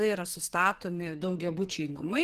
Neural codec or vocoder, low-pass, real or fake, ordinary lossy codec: codec, 44.1 kHz, 2.6 kbps, SNAC; 14.4 kHz; fake; Opus, 16 kbps